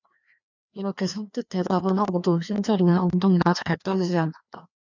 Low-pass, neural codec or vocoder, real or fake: 7.2 kHz; codec, 16 kHz, 2 kbps, FreqCodec, larger model; fake